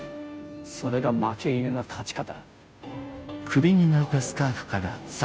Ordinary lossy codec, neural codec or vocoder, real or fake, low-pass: none; codec, 16 kHz, 0.5 kbps, FunCodec, trained on Chinese and English, 25 frames a second; fake; none